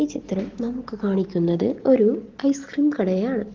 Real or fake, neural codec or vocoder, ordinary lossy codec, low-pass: real; none; Opus, 16 kbps; 7.2 kHz